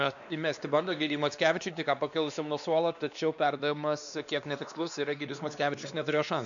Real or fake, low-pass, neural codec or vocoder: fake; 7.2 kHz; codec, 16 kHz, 2 kbps, X-Codec, WavLM features, trained on Multilingual LibriSpeech